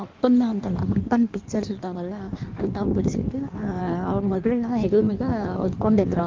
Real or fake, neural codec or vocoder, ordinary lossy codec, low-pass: fake; codec, 16 kHz in and 24 kHz out, 1.1 kbps, FireRedTTS-2 codec; Opus, 32 kbps; 7.2 kHz